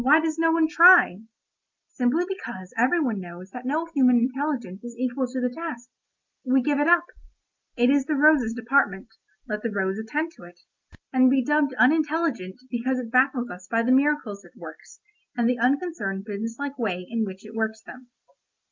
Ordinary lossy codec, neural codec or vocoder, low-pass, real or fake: Opus, 24 kbps; none; 7.2 kHz; real